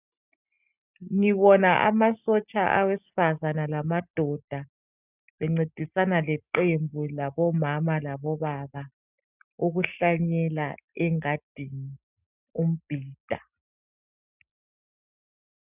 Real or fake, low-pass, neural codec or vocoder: real; 3.6 kHz; none